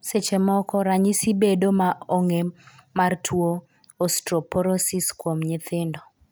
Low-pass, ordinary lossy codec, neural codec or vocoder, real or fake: none; none; none; real